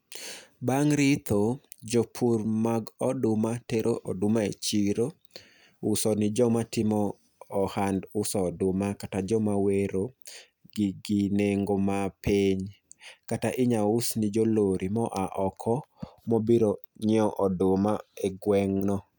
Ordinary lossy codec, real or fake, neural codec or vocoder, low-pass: none; real; none; none